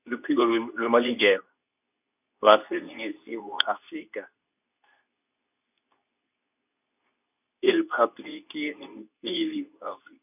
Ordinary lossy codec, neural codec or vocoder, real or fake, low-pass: none; codec, 24 kHz, 0.9 kbps, WavTokenizer, medium speech release version 2; fake; 3.6 kHz